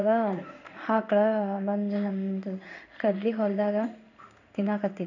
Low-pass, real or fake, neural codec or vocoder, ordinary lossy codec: 7.2 kHz; fake; codec, 16 kHz in and 24 kHz out, 1 kbps, XY-Tokenizer; none